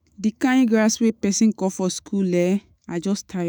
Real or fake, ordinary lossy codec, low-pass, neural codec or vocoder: fake; none; none; autoencoder, 48 kHz, 128 numbers a frame, DAC-VAE, trained on Japanese speech